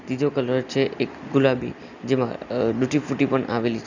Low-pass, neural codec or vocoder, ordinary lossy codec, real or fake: 7.2 kHz; none; none; real